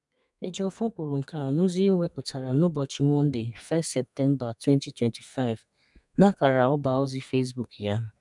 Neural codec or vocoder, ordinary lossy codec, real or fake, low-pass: codec, 32 kHz, 1.9 kbps, SNAC; none; fake; 10.8 kHz